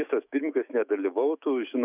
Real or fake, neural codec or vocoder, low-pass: fake; autoencoder, 48 kHz, 128 numbers a frame, DAC-VAE, trained on Japanese speech; 3.6 kHz